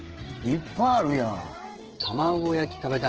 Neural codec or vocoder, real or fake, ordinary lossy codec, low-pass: codec, 16 kHz, 16 kbps, FreqCodec, smaller model; fake; Opus, 16 kbps; 7.2 kHz